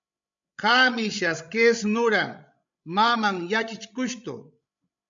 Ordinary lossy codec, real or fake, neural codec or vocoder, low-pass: MP3, 64 kbps; fake; codec, 16 kHz, 16 kbps, FreqCodec, larger model; 7.2 kHz